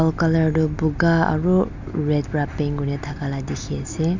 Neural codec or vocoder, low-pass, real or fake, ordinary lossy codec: none; 7.2 kHz; real; none